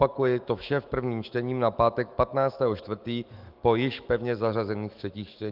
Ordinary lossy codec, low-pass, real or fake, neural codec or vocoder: Opus, 32 kbps; 5.4 kHz; fake; autoencoder, 48 kHz, 128 numbers a frame, DAC-VAE, trained on Japanese speech